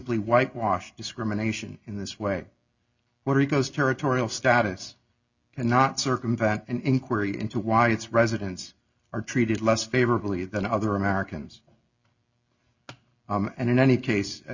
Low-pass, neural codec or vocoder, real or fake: 7.2 kHz; none; real